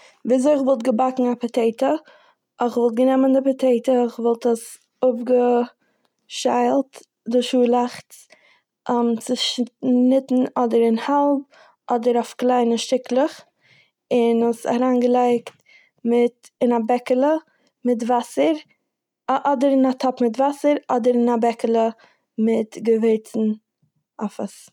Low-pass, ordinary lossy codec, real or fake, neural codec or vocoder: 19.8 kHz; none; real; none